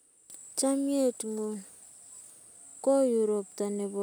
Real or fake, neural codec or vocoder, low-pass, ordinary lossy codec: real; none; none; none